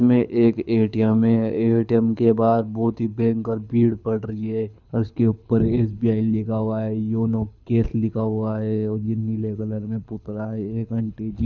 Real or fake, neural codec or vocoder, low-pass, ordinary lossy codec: fake; codec, 24 kHz, 6 kbps, HILCodec; 7.2 kHz; none